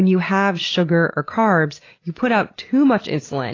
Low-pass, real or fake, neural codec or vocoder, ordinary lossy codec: 7.2 kHz; real; none; AAC, 32 kbps